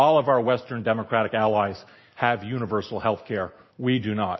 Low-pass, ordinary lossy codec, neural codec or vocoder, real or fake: 7.2 kHz; MP3, 24 kbps; none; real